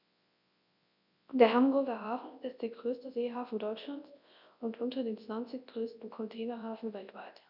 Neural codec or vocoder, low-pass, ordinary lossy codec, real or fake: codec, 24 kHz, 0.9 kbps, WavTokenizer, large speech release; 5.4 kHz; none; fake